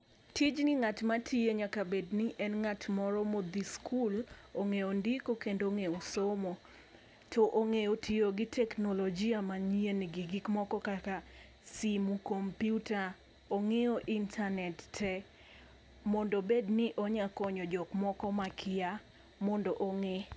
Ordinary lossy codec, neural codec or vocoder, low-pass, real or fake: none; none; none; real